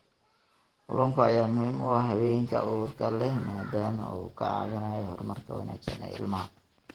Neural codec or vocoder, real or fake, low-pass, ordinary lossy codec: vocoder, 48 kHz, 128 mel bands, Vocos; fake; 19.8 kHz; Opus, 16 kbps